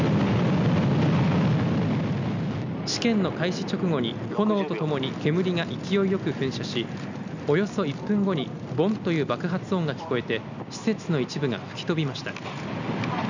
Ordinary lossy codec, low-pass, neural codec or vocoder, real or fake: none; 7.2 kHz; none; real